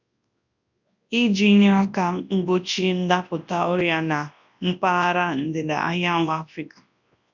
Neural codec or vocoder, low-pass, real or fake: codec, 24 kHz, 0.9 kbps, WavTokenizer, large speech release; 7.2 kHz; fake